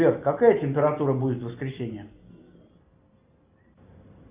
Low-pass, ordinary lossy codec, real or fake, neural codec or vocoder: 3.6 kHz; Opus, 64 kbps; real; none